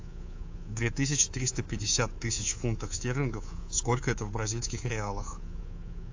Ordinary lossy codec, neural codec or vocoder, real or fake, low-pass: AAC, 48 kbps; codec, 24 kHz, 3.1 kbps, DualCodec; fake; 7.2 kHz